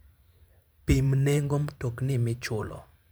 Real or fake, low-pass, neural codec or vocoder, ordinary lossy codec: fake; none; vocoder, 44.1 kHz, 128 mel bands every 512 samples, BigVGAN v2; none